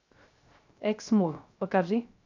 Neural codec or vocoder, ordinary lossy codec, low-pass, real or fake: codec, 16 kHz, 0.3 kbps, FocalCodec; AAC, 48 kbps; 7.2 kHz; fake